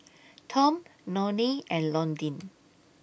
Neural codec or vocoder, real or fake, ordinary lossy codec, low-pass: none; real; none; none